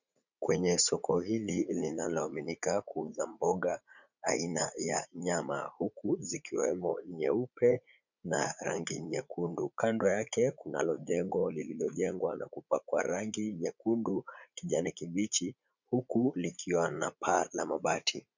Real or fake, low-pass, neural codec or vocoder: fake; 7.2 kHz; vocoder, 44.1 kHz, 80 mel bands, Vocos